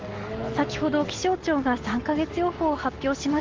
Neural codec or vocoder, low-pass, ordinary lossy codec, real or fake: none; 7.2 kHz; Opus, 16 kbps; real